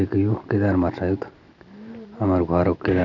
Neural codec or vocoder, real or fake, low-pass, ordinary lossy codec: none; real; 7.2 kHz; none